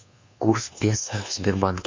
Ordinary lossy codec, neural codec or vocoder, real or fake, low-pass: MP3, 64 kbps; codec, 24 kHz, 1.2 kbps, DualCodec; fake; 7.2 kHz